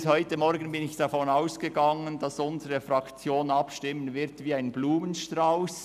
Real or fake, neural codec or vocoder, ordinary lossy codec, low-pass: real; none; none; 14.4 kHz